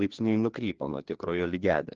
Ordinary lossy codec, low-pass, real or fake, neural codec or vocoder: Opus, 16 kbps; 7.2 kHz; fake; codec, 16 kHz, 2 kbps, FreqCodec, larger model